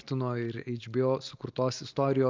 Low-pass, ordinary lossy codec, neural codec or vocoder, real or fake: 7.2 kHz; Opus, 24 kbps; none; real